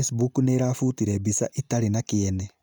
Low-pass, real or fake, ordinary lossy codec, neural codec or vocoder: none; real; none; none